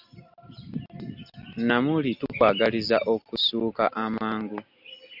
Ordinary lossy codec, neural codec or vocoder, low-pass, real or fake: AAC, 48 kbps; none; 5.4 kHz; real